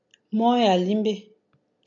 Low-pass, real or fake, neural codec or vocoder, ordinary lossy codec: 7.2 kHz; real; none; MP3, 64 kbps